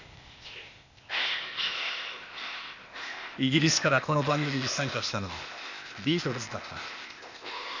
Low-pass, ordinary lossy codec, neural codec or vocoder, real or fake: 7.2 kHz; none; codec, 16 kHz, 0.8 kbps, ZipCodec; fake